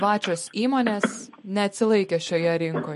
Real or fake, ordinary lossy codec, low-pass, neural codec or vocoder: real; MP3, 48 kbps; 14.4 kHz; none